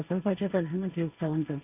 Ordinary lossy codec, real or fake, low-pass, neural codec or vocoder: none; fake; 3.6 kHz; codec, 16 kHz, 1.1 kbps, Voila-Tokenizer